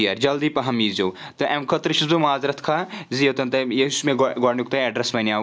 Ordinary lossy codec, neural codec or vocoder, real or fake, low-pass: none; none; real; none